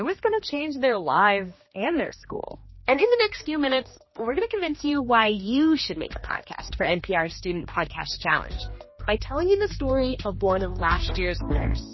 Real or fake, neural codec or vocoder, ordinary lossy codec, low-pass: fake; codec, 16 kHz, 2 kbps, X-Codec, HuBERT features, trained on general audio; MP3, 24 kbps; 7.2 kHz